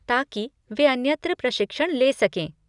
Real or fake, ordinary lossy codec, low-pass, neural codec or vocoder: real; none; 10.8 kHz; none